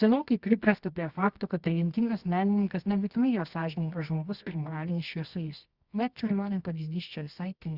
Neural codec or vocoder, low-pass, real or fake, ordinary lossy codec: codec, 24 kHz, 0.9 kbps, WavTokenizer, medium music audio release; 5.4 kHz; fake; Opus, 64 kbps